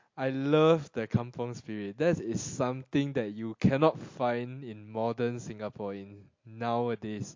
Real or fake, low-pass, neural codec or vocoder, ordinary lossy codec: real; 7.2 kHz; none; MP3, 48 kbps